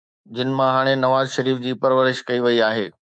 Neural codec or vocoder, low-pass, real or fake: codec, 24 kHz, 3.1 kbps, DualCodec; 9.9 kHz; fake